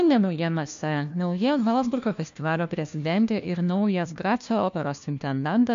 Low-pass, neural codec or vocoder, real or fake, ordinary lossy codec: 7.2 kHz; codec, 16 kHz, 1 kbps, FunCodec, trained on LibriTTS, 50 frames a second; fake; MP3, 64 kbps